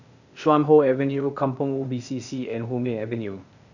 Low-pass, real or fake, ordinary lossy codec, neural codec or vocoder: 7.2 kHz; fake; none; codec, 16 kHz, 0.8 kbps, ZipCodec